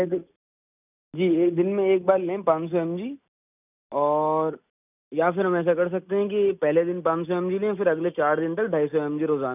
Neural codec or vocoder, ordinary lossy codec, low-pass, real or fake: none; none; 3.6 kHz; real